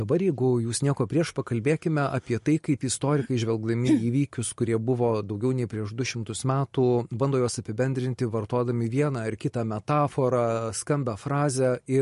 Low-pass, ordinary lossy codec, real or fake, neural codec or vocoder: 14.4 kHz; MP3, 48 kbps; real; none